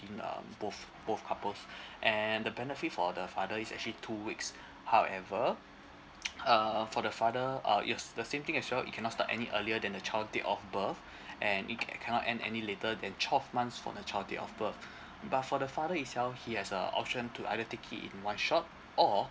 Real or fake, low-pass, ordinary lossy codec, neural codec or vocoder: real; none; none; none